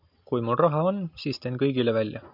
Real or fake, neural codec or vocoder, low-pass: real; none; 5.4 kHz